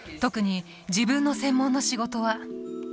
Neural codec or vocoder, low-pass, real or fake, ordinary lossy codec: none; none; real; none